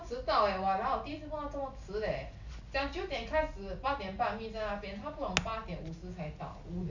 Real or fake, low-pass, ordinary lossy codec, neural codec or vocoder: real; 7.2 kHz; none; none